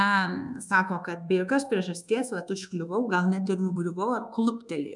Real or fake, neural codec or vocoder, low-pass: fake; codec, 24 kHz, 1.2 kbps, DualCodec; 10.8 kHz